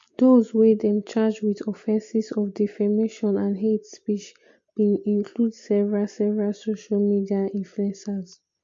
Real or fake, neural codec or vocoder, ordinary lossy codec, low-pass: real; none; MP3, 48 kbps; 7.2 kHz